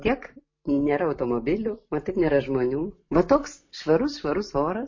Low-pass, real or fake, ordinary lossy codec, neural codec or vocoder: 7.2 kHz; real; MP3, 32 kbps; none